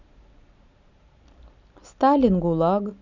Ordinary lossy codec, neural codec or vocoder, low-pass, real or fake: none; none; 7.2 kHz; real